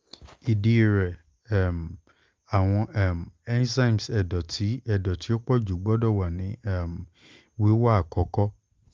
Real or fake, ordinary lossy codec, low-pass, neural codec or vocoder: real; Opus, 32 kbps; 7.2 kHz; none